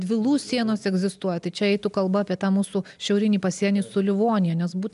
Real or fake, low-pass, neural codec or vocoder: real; 10.8 kHz; none